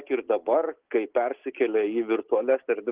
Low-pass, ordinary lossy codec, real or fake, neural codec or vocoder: 3.6 kHz; Opus, 16 kbps; real; none